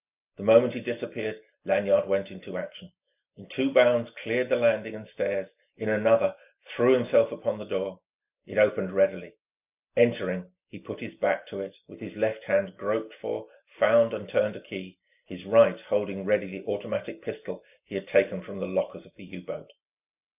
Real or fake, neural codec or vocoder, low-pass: real; none; 3.6 kHz